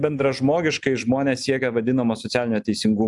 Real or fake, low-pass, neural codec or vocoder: real; 10.8 kHz; none